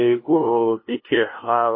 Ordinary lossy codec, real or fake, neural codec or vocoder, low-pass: MP3, 24 kbps; fake; codec, 16 kHz, 0.5 kbps, FunCodec, trained on LibriTTS, 25 frames a second; 5.4 kHz